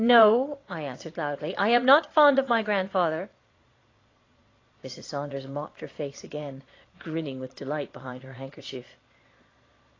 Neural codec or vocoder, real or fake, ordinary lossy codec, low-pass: vocoder, 22.05 kHz, 80 mel bands, Vocos; fake; AAC, 32 kbps; 7.2 kHz